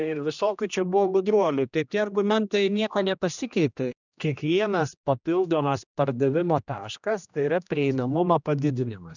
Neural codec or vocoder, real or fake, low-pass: codec, 16 kHz, 1 kbps, X-Codec, HuBERT features, trained on general audio; fake; 7.2 kHz